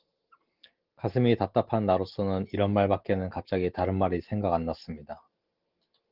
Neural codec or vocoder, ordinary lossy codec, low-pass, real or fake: none; Opus, 16 kbps; 5.4 kHz; real